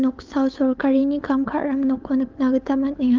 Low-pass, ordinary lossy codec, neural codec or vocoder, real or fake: 7.2 kHz; Opus, 32 kbps; codec, 16 kHz, 8 kbps, FunCodec, trained on LibriTTS, 25 frames a second; fake